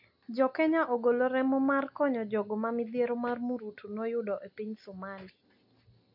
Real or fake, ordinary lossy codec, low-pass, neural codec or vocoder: real; AAC, 48 kbps; 5.4 kHz; none